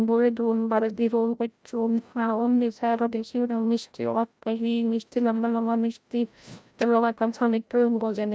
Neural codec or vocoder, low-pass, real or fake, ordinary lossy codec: codec, 16 kHz, 0.5 kbps, FreqCodec, larger model; none; fake; none